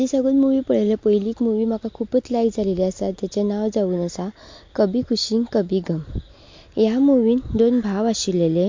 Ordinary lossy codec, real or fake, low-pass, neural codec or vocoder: MP3, 48 kbps; real; 7.2 kHz; none